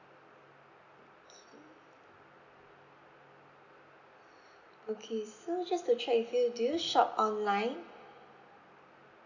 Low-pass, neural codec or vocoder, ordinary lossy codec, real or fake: 7.2 kHz; none; none; real